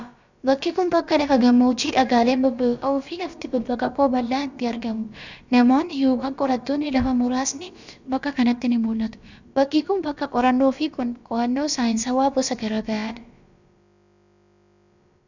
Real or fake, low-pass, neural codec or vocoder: fake; 7.2 kHz; codec, 16 kHz, about 1 kbps, DyCAST, with the encoder's durations